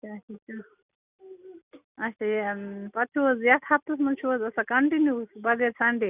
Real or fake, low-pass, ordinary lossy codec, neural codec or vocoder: real; 3.6 kHz; none; none